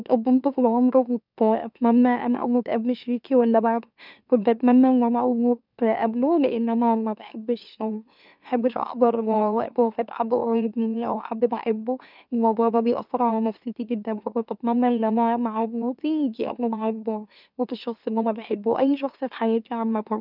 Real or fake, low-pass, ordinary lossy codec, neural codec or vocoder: fake; 5.4 kHz; none; autoencoder, 44.1 kHz, a latent of 192 numbers a frame, MeloTTS